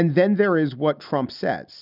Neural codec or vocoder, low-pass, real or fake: none; 5.4 kHz; real